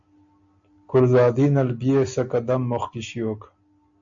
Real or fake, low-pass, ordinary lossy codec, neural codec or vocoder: real; 7.2 kHz; AAC, 64 kbps; none